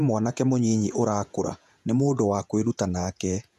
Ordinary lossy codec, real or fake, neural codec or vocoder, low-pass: none; fake; vocoder, 48 kHz, 128 mel bands, Vocos; 14.4 kHz